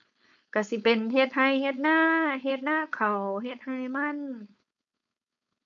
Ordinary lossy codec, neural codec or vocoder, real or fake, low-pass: none; codec, 16 kHz, 4.8 kbps, FACodec; fake; 7.2 kHz